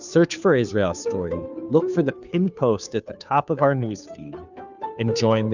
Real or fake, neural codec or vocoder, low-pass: fake; codec, 16 kHz, 2 kbps, FunCodec, trained on Chinese and English, 25 frames a second; 7.2 kHz